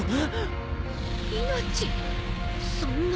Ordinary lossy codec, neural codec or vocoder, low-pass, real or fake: none; none; none; real